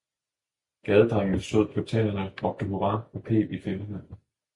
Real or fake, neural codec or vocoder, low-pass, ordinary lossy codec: real; none; 10.8 kHz; Opus, 64 kbps